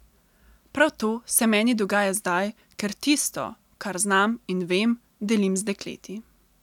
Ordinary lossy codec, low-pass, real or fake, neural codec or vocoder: none; 19.8 kHz; real; none